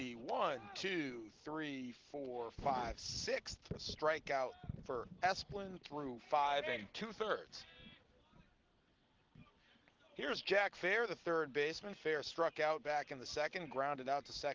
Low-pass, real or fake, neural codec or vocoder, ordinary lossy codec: 7.2 kHz; real; none; Opus, 16 kbps